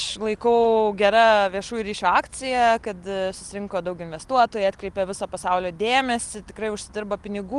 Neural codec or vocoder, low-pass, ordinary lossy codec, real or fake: none; 10.8 kHz; Opus, 24 kbps; real